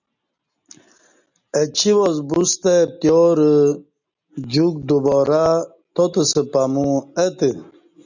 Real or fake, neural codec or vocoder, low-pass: real; none; 7.2 kHz